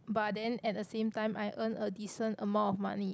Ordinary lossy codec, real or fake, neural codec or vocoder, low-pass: none; real; none; none